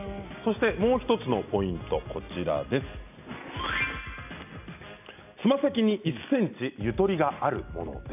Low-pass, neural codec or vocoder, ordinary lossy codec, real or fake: 3.6 kHz; none; none; real